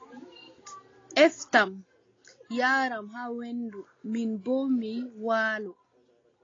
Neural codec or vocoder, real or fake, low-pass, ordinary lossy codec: none; real; 7.2 kHz; AAC, 32 kbps